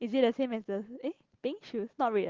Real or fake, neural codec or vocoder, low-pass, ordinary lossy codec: real; none; 7.2 kHz; Opus, 16 kbps